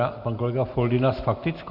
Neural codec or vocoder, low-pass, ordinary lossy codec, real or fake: none; 5.4 kHz; AAC, 48 kbps; real